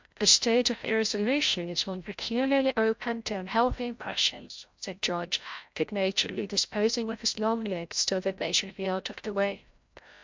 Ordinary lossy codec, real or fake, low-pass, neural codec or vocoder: MP3, 64 kbps; fake; 7.2 kHz; codec, 16 kHz, 0.5 kbps, FreqCodec, larger model